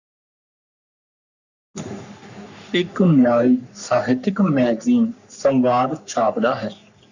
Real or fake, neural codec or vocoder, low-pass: fake; codec, 44.1 kHz, 3.4 kbps, Pupu-Codec; 7.2 kHz